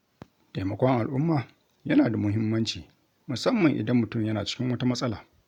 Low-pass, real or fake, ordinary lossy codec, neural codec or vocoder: 19.8 kHz; real; none; none